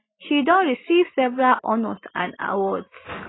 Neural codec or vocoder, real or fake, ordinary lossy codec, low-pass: none; real; AAC, 16 kbps; 7.2 kHz